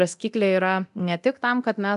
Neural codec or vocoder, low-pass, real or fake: codec, 24 kHz, 0.9 kbps, DualCodec; 10.8 kHz; fake